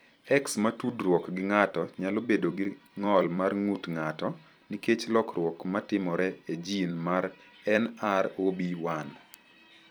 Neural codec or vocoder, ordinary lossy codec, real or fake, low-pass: vocoder, 44.1 kHz, 128 mel bands every 256 samples, BigVGAN v2; none; fake; none